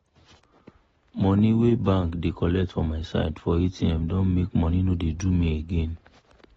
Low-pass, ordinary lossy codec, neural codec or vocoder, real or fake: 14.4 kHz; AAC, 24 kbps; none; real